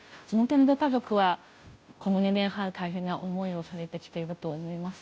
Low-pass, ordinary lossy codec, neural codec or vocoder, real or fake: none; none; codec, 16 kHz, 0.5 kbps, FunCodec, trained on Chinese and English, 25 frames a second; fake